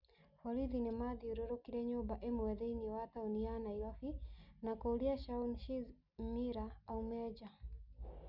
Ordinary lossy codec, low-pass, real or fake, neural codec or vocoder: none; 5.4 kHz; real; none